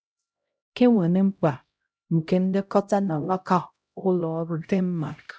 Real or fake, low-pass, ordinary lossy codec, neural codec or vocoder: fake; none; none; codec, 16 kHz, 0.5 kbps, X-Codec, HuBERT features, trained on LibriSpeech